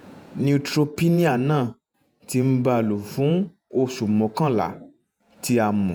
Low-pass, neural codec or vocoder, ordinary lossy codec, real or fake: none; vocoder, 48 kHz, 128 mel bands, Vocos; none; fake